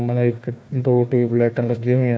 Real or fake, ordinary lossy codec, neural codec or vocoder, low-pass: fake; none; codec, 16 kHz, 1 kbps, FunCodec, trained on Chinese and English, 50 frames a second; none